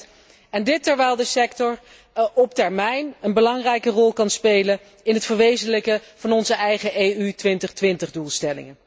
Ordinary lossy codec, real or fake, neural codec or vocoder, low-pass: none; real; none; none